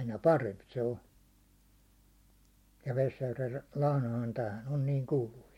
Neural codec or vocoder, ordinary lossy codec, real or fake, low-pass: none; MP3, 64 kbps; real; 19.8 kHz